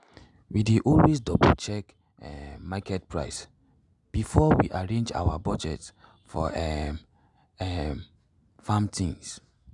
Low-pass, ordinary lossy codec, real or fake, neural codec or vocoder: 10.8 kHz; none; real; none